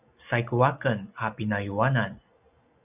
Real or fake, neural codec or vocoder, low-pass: real; none; 3.6 kHz